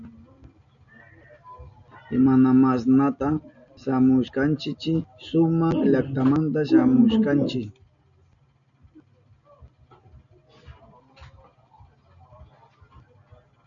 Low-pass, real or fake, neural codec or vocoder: 7.2 kHz; real; none